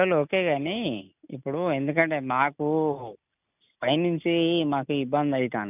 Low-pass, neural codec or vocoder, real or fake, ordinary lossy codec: 3.6 kHz; none; real; none